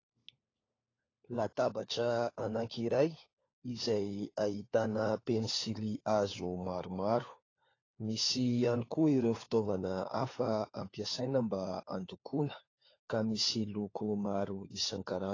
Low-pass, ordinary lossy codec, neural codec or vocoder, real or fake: 7.2 kHz; AAC, 32 kbps; codec, 16 kHz, 4 kbps, FunCodec, trained on LibriTTS, 50 frames a second; fake